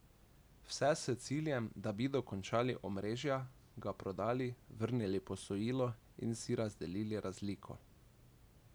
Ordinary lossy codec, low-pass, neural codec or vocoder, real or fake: none; none; none; real